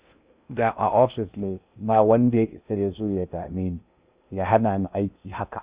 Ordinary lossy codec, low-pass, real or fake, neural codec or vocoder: Opus, 64 kbps; 3.6 kHz; fake; codec, 16 kHz in and 24 kHz out, 0.6 kbps, FocalCodec, streaming, 2048 codes